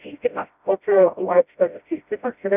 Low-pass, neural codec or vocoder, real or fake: 3.6 kHz; codec, 16 kHz, 0.5 kbps, FreqCodec, smaller model; fake